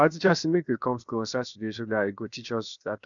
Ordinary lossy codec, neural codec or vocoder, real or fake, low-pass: none; codec, 16 kHz, 0.7 kbps, FocalCodec; fake; 7.2 kHz